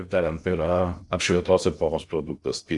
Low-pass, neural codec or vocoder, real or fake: 10.8 kHz; codec, 16 kHz in and 24 kHz out, 0.8 kbps, FocalCodec, streaming, 65536 codes; fake